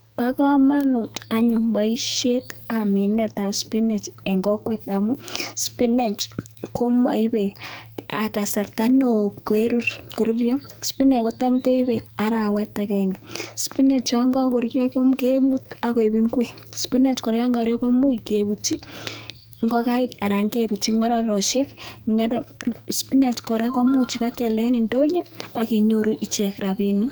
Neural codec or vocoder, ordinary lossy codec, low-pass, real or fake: codec, 44.1 kHz, 2.6 kbps, SNAC; none; none; fake